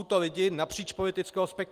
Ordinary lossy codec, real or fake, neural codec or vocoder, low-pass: Opus, 24 kbps; real; none; 14.4 kHz